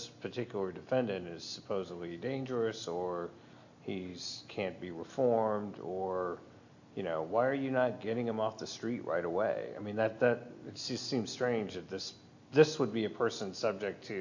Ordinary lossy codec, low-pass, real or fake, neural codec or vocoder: AAC, 48 kbps; 7.2 kHz; real; none